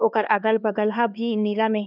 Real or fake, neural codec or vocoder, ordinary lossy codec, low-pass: fake; codec, 16 kHz, 2 kbps, X-Codec, HuBERT features, trained on LibriSpeech; none; 5.4 kHz